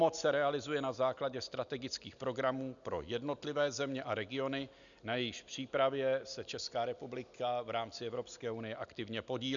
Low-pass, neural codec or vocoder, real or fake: 7.2 kHz; none; real